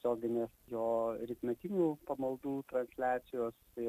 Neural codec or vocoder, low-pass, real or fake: none; 14.4 kHz; real